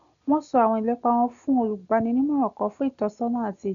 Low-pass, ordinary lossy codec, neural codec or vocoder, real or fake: 7.2 kHz; none; none; real